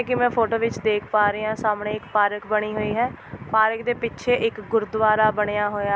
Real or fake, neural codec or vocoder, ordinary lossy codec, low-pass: real; none; none; none